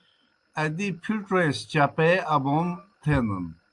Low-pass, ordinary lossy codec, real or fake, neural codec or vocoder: 10.8 kHz; Opus, 32 kbps; real; none